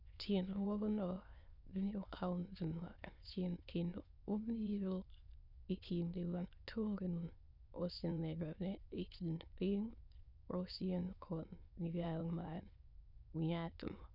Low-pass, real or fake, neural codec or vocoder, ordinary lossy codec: 5.4 kHz; fake; autoencoder, 22.05 kHz, a latent of 192 numbers a frame, VITS, trained on many speakers; none